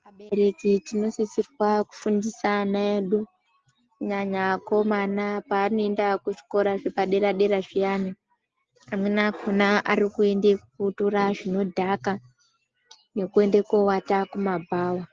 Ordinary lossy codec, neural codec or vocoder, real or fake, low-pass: Opus, 16 kbps; none; real; 7.2 kHz